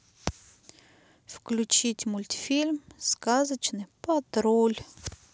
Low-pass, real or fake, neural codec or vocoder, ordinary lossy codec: none; real; none; none